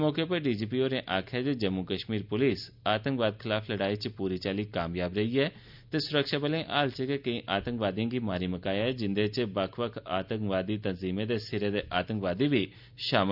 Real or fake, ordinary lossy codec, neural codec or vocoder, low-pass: real; none; none; 5.4 kHz